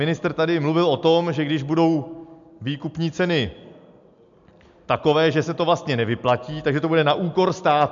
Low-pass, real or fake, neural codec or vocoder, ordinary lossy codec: 7.2 kHz; real; none; MP3, 64 kbps